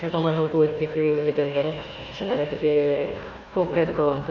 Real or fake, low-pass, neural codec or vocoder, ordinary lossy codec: fake; 7.2 kHz; codec, 16 kHz, 1 kbps, FunCodec, trained on Chinese and English, 50 frames a second; none